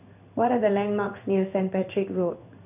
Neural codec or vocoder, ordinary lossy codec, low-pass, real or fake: codec, 16 kHz in and 24 kHz out, 1 kbps, XY-Tokenizer; none; 3.6 kHz; fake